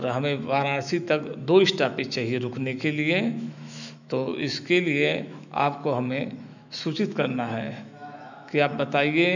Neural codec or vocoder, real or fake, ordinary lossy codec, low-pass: autoencoder, 48 kHz, 128 numbers a frame, DAC-VAE, trained on Japanese speech; fake; none; 7.2 kHz